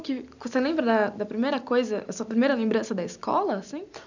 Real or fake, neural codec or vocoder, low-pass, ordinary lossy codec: real; none; 7.2 kHz; none